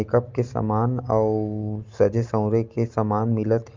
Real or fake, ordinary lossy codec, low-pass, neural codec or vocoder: real; Opus, 24 kbps; 7.2 kHz; none